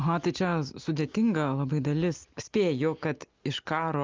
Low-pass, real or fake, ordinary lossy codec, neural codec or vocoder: 7.2 kHz; real; Opus, 24 kbps; none